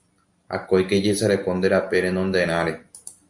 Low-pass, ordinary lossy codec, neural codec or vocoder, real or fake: 10.8 kHz; Opus, 64 kbps; none; real